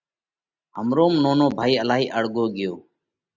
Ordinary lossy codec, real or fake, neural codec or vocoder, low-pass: Opus, 64 kbps; real; none; 7.2 kHz